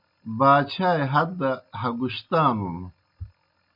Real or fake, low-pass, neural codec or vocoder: real; 5.4 kHz; none